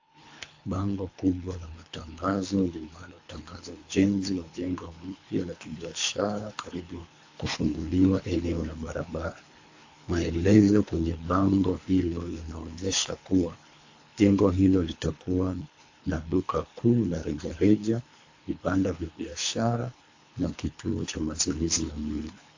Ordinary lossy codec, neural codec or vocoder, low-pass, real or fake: AAC, 48 kbps; codec, 24 kHz, 3 kbps, HILCodec; 7.2 kHz; fake